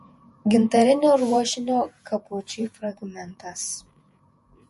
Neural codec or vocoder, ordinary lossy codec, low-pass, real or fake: vocoder, 48 kHz, 128 mel bands, Vocos; MP3, 48 kbps; 14.4 kHz; fake